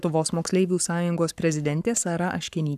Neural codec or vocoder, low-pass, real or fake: codec, 44.1 kHz, 7.8 kbps, Pupu-Codec; 14.4 kHz; fake